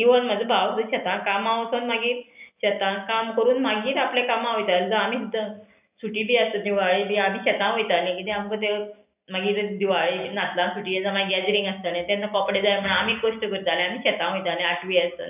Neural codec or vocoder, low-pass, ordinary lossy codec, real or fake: none; 3.6 kHz; none; real